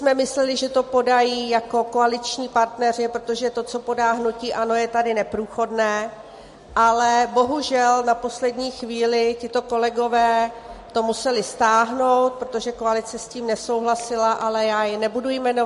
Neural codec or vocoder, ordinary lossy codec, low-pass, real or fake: none; MP3, 48 kbps; 14.4 kHz; real